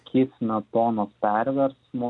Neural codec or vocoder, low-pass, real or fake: none; 10.8 kHz; real